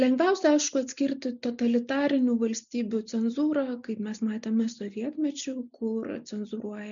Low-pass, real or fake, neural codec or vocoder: 7.2 kHz; real; none